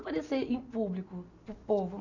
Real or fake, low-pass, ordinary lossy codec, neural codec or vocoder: real; 7.2 kHz; none; none